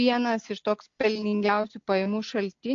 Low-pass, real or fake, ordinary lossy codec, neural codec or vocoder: 7.2 kHz; real; AAC, 48 kbps; none